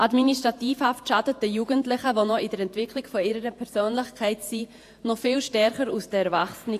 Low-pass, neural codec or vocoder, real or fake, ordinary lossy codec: 14.4 kHz; vocoder, 48 kHz, 128 mel bands, Vocos; fake; AAC, 64 kbps